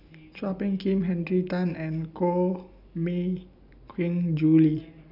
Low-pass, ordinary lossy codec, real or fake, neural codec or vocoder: 5.4 kHz; none; real; none